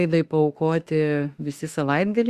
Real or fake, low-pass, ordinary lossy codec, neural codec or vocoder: fake; 14.4 kHz; Opus, 64 kbps; autoencoder, 48 kHz, 32 numbers a frame, DAC-VAE, trained on Japanese speech